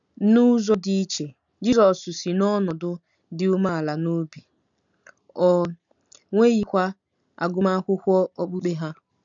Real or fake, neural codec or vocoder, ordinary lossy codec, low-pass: real; none; none; 7.2 kHz